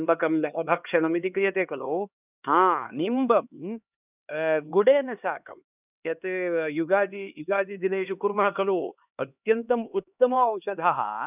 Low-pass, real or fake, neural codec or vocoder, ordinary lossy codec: 3.6 kHz; fake; codec, 16 kHz, 2 kbps, X-Codec, HuBERT features, trained on LibriSpeech; none